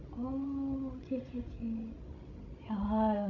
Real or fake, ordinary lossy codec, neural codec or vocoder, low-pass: fake; AAC, 48 kbps; codec, 16 kHz, 16 kbps, FreqCodec, larger model; 7.2 kHz